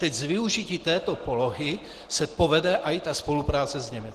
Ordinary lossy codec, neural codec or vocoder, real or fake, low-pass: Opus, 16 kbps; none; real; 14.4 kHz